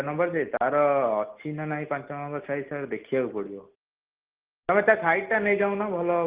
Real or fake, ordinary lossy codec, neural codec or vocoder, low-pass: real; Opus, 16 kbps; none; 3.6 kHz